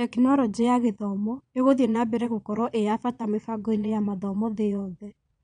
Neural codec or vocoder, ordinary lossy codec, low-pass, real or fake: vocoder, 22.05 kHz, 80 mel bands, Vocos; none; 9.9 kHz; fake